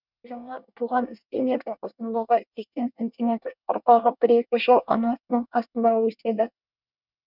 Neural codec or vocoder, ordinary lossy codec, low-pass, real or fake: codec, 24 kHz, 1 kbps, SNAC; none; 5.4 kHz; fake